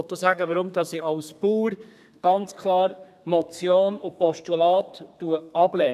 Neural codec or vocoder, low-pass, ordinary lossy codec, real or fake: codec, 32 kHz, 1.9 kbps, SNAC; 14.4 kHz; none; fake